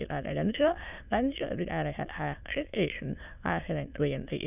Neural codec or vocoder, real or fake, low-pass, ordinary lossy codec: autoencoder, 22.05 kHz, a latent of 192 numbers a frame, VITS, trained on many speakers; fake; 3.6 kHz; none